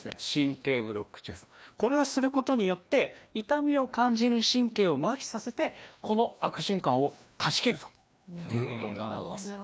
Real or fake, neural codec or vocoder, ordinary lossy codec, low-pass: fake; codec, 16 kHz, 1 kbps, FreqCodec, larger model; none; none